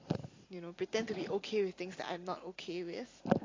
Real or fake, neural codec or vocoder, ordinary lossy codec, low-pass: real; none; AAC, 32 kbps; 7.2 kHz